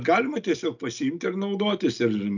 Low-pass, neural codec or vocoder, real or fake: 7.2 kHz; none; real